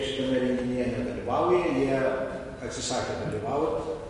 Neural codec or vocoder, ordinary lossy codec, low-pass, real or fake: none; MP3, 48 kbps; 10.8 kHz; real